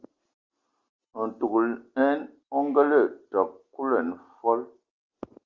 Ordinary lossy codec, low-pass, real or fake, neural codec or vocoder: Opus, 32 kbps; 7.2 kHz; real; none